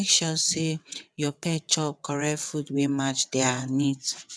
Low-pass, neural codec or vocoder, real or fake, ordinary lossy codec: none; vocoder, 22.05 kHz, 80 mel bands, WaveNeXt; fake; none